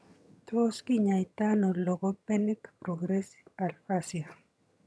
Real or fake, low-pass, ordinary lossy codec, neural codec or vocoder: fake; none; none; vocoder, 22.05 kHz, 80 mel bands, HiFi-GAN